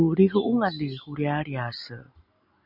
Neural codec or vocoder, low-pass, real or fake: none; 5.4 kHz; real